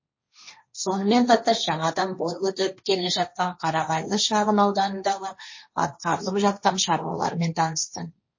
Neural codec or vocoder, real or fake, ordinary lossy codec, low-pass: codec, 16 kHz, 1.1 kbps, Voila-Tokenizer; fake; MP3, 32 kbps; 7.2 kHz